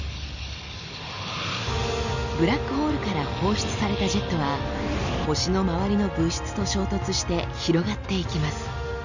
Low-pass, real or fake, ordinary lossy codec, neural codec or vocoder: 7.2 kHz; real; none; none